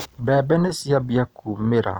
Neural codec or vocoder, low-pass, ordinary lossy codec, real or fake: none; none; none; real